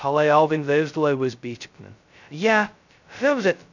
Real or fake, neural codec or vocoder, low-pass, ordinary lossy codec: fake; codec, 16 kHz, 0.2 kbps, FocalCodec; 7.2 kHz; none